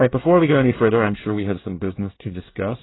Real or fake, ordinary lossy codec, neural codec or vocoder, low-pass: fake; AAC, 16 kbps; codec, 24 kHz, 1 kbps, SNAC; 7.2 kHz